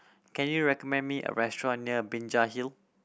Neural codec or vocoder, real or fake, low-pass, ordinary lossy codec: none; real; none; none